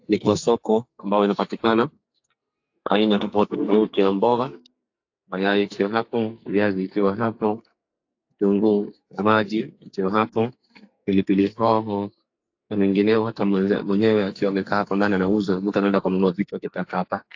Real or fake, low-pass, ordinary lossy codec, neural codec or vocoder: fake; 7.2 kHz; AAC, 48 kbps; codec, 44.1 kHz, 2.6 kbps, SNAC